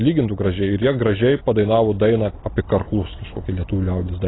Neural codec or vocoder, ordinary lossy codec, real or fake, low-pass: none; AAC, 16 kbps; real; 7.2 kHz